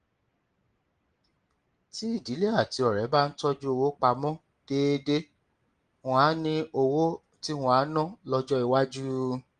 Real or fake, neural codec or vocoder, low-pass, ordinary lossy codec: real; none; 9.9 kHz; Opus, 24 kbps